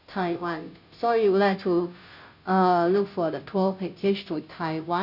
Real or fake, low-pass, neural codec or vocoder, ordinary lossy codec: fake; 5.4 kHz; codec, 16 kHz, 0.5 kbps, FunCodec, trained on Chinese and English, 25 frames a second; none